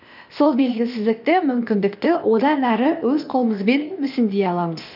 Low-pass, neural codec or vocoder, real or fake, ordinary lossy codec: 5.4 kHz; codec, 16 kHz, 0.8 kbps, ZipCodec; fake; none